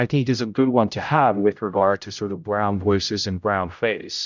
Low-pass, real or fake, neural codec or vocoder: 7.2 kHz; fake; codec, 16 kHz, 0.5 kbps, X-Codec, HuBERT features, trained on general audio